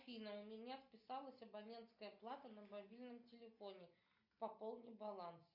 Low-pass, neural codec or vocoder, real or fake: 5.4 kHz; none; real